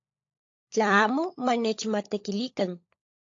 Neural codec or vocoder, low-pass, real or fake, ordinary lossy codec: codec, 16 kHz, 16 kbps, FunCodec, trained on LibriTTS, 50 frames a second; 7.2 kHz; fake; AAC, 48 kbps